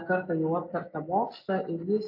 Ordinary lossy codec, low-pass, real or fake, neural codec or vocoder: AAC, 48 kbps; 5.4 kHz; real; none